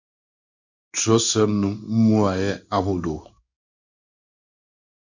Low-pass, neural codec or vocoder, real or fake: 7.2 kHz; codec, 16 kHz in and 24 kHz out, 1 kbps, XY-Tokenizer; fake